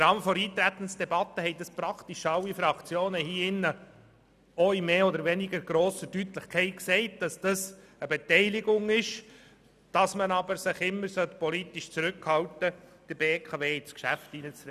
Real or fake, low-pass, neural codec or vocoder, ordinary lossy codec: real; 14.4 kHz; none; none